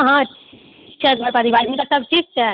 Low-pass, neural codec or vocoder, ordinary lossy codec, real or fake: 5.4 kHz; none; none; real